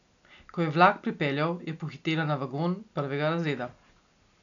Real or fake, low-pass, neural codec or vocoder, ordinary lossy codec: real; 7.2 kHz; none; none